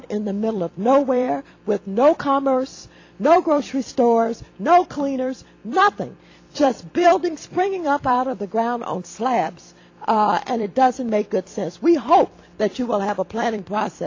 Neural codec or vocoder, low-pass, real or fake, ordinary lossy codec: vocoder, 44.1 kHz, 128 mel bands every 256 samples, BigVGAN v2; 7.2 kHz; fake; AAC, 48 kbps